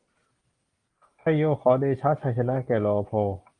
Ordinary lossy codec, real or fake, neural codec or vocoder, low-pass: Opus, 24 kbps; real; none; 9.9 kHz